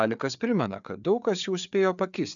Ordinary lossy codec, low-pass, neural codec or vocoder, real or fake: MP3, 96 kbps; 7.2 kHz; codec, 16 kHz, 4 kbps, FreqCodec, larger model; fake